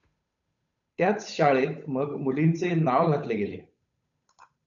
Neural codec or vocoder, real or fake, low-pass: codec, 16 kHz, 8 kbps, FunCodec, trained on Chinese and English, 25 frames a second; fake; 7.2 kHz